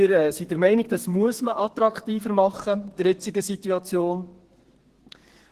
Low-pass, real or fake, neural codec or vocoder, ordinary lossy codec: 14.4 kHz; fake; codec, 32 kHz, 1.9 kbps, SNAC; Opus, 16 kbps